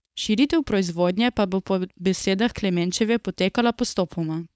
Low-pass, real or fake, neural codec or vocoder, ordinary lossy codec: none; fake; codec, 16 kHz, 4.8 kbps, FACodec; none